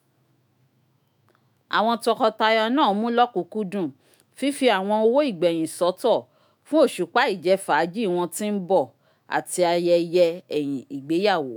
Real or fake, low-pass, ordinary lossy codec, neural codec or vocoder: fake; none; none; autoencoder, 48 kHz, 128 numbers a frame, DAC-VAE, trained on Japanese speech